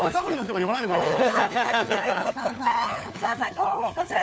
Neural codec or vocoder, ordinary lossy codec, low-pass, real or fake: codec, 16 kHz, 4 kbps, FunCodec, trained on LibriTTS, 50 frames a second; none; none; fake